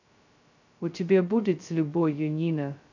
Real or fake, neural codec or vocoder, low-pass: fake; codec, 16 kHz, 0.2 kbps, FocalCodec; 7.2 kHz